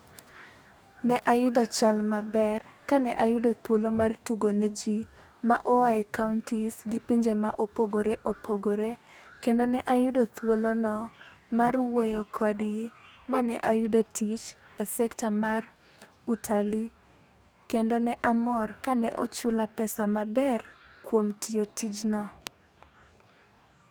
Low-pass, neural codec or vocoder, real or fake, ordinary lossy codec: none; codec, 44.1 kHz, 2.6 kbps, DAC; fake; none